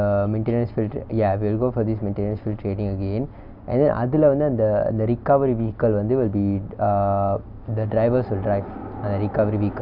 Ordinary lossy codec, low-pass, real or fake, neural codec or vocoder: none; 5.4 kHz; real; none